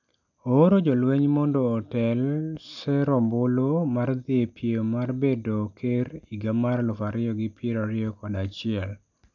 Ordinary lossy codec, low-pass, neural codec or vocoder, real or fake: none; 7.2 kHz; none; real